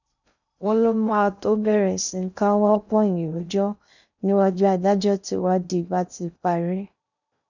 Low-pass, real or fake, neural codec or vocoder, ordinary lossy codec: 7.2 kHz; fake; codec, 16 kHz in and 24 kHz out, 0.6 kbps, FocalCodec, streaming, 2048 codes; none